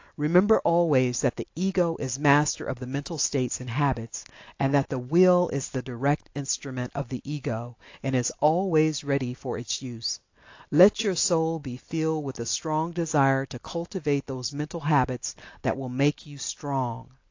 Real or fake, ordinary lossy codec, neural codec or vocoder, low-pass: real; AAC, 48 kbps; none; 7.2 kHz